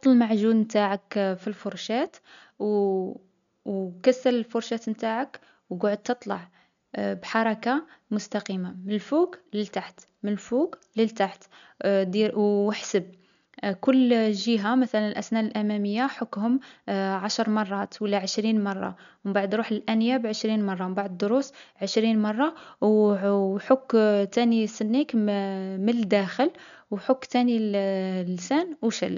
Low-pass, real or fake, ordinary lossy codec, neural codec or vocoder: 7.2 kHz; real; none; none